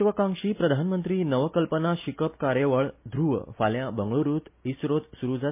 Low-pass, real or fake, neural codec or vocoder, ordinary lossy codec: 3.6 kHz; real; none; MP3, 24 kbps